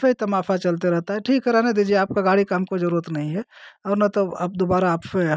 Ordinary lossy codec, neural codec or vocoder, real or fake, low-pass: none; none; real; none